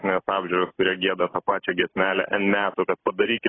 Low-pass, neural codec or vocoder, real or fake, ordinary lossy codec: 7.2 kHz; none; real; AAC, 16 kbps